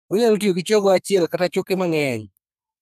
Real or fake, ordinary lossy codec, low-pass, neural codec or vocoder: fake; none; 14.4 kHz; codec, 32 kHz, 1.9 kbps, SNAC